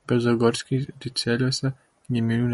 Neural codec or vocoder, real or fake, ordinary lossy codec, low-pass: none; real; MP3, 48 kbps; 19.8 kHz